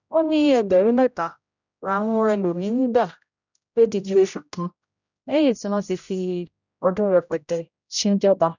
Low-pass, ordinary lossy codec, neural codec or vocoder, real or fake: 7.2 kHz; none; codec, 16 kHz, 0.5 kbps, X-Codec, HuBERT features, trained on general audio; fake